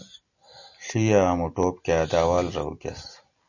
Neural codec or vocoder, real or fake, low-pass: none; real; 7.2 kHz